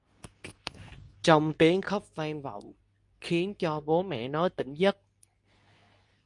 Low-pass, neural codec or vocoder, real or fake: 10.8 kHz; codec, 24 kHz, 0.9 kbps, WavTokenizer, medium speech release version 2; fake